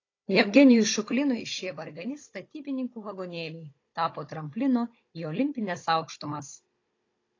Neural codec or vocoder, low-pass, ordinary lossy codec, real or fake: codec, 16 kHz, 16 kbps, FunCodec, trained on Chinese and English, 50 frames a second; 7.2 kHz; AAC, 32 kbps; fake